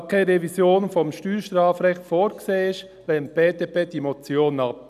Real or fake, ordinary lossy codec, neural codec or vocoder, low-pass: real; none; none; 14.4 kHz